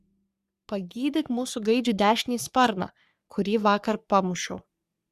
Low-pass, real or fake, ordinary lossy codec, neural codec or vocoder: 14.4 kHz; fake; Opus, 64 kbps; codec, 44.1 kHz, 3.4 kbps, Pupu-Codec